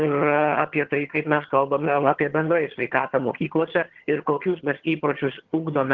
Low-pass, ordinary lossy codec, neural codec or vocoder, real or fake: 7.2 kHz; Opus, 16 kbps; vocoder, 22.05 kHz, 80 mel bands, HiFi-GAN; fake